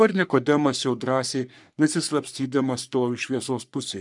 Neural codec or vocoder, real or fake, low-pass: codec, 44.1 kHz, 3.4 kbps, Pupu-Codec; fake; 10.8 kHz